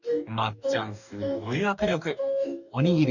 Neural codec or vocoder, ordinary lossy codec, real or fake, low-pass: codec, 44.1 kHz, 2.6 kbps, DAC; none; fake; 7.2 kHz